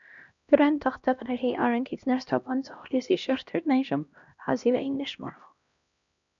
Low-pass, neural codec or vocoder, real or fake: 7.2 kHz; codec, 16 kHz, 1 kbps, X-Codec, HuBERT features, trained on LibriSpeech; fake